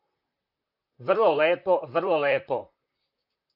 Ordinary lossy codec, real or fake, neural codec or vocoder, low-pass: AAC, 48 kbps; fake; vocoder, 44.1 kHz, 128 mel bands, Pupu-Vocoder; 5.4 kHz